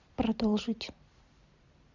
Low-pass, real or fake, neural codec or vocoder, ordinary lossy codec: 7.2 kHz; fake; vocoder, 44.1 kHz, 128 mel bands every 256 samples, BigVGAN v2; Opus, 64 kbps